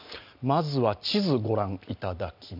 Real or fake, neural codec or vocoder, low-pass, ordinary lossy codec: real; none; 5.4 kHz; none